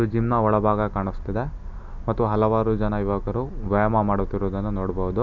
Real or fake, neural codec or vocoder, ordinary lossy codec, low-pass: real; none; none; 7.2 kHz